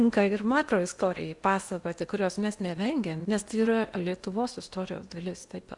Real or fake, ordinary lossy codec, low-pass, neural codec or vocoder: fake; Opus, 64 kbps; 10.8 kHz; codec, 16 kHz in and 24 kHz out, 0.8 kbps, FocalCodec, streaming, 65536 codes